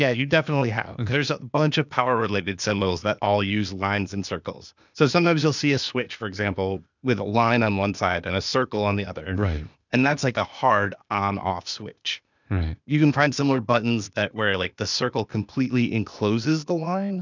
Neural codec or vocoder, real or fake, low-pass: codec, 16 kHz, 0.8 kbps, ZipCodec; fake; 7.2 kHz